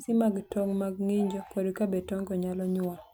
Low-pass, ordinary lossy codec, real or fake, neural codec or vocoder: none; none; real; none